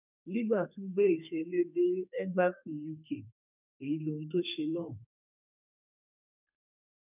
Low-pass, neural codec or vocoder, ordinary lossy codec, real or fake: 3.6 kHz; codec, 32 kHz, 1.9 kbps, SNAC; none; fake